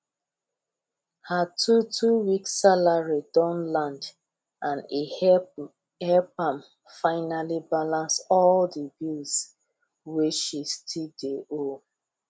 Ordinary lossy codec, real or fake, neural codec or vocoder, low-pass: none; real; none; none